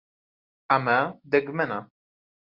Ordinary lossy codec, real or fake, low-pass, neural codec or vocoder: Opus, 64 kbps; real; 5.4 kHz; none